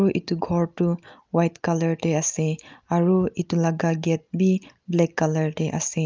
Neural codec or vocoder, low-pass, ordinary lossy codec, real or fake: none; 7.2 kHz; Opus, 24 kbps; real